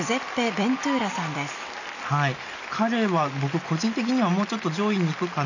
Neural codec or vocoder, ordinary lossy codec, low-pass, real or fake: none; none; 7.2 kHz; real